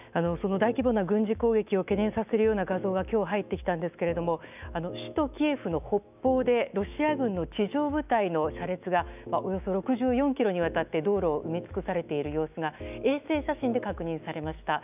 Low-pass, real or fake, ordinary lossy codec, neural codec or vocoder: 3.6 kHz; fake; none; autoencoder, 48 kHz, 128 numbers a frame, DAC-VAE, trained on Japanese speech